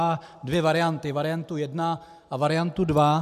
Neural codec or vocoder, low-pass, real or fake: none; 14.4 kHz; real